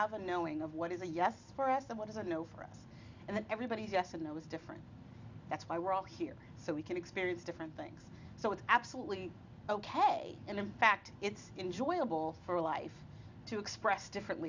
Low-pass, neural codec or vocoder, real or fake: 7.2 kHz; none; real